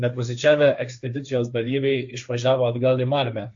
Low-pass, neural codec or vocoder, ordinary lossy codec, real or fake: 7.2 kHz; codec, 16 kHz, 1.1 kbps, Voila-Tokenizer; AAC, 64 kbps; fake